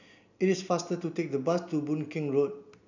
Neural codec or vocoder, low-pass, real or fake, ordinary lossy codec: none; 7.2 kHz; real; none